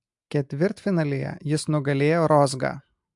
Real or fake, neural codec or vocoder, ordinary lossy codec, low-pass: real; none; MP3, 64 kbps; 10.8 kHz